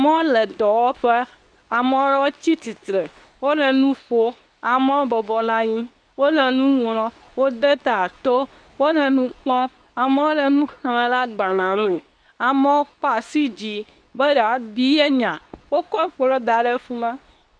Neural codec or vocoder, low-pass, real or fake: codec, 24 kHz, 0.9 kbps, WavTokenizer, medium speech release version 2; 9.9 kHz; fake